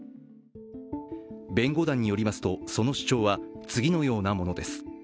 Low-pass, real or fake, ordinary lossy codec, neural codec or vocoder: none; real; none; none